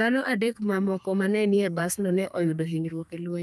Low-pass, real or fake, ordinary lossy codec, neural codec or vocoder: 14.4 kHz; fake; none; codec, 32 kHz, 1.9 kbps, SNAC